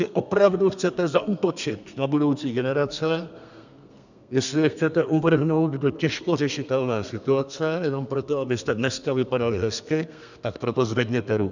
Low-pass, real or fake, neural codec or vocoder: 7.2 kHz; fake; codec, 32 kHz, 1.9 kbps, SNAC